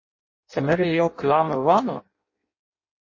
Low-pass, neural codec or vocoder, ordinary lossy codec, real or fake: 7.2 kHz; codec, 16 kHz in and 24 kHz out, 0.6 kbps, FireRedTTS-2 codec; MP3, 32 kbps; fake